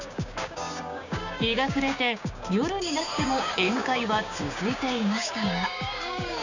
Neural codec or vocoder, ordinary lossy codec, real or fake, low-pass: codec, 16 kHz, 6 kbps, DAC; none; fake; 7.2 kHz